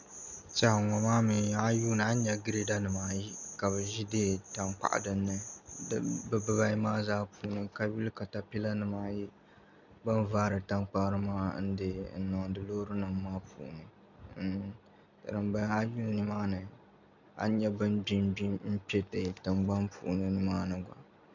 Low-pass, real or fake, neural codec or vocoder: 7.2 kHz; real; none